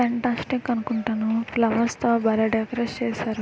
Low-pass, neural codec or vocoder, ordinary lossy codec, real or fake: none; none; none; real